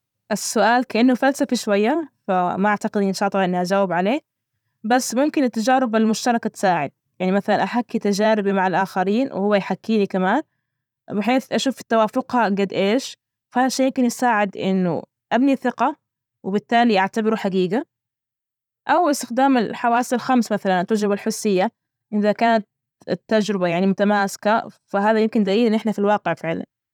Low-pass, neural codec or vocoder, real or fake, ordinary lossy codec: 19.8 kHz; vocoder, 44.1 kHz, 128 mel bands every 512 samples, BigVGAN v2; fake; none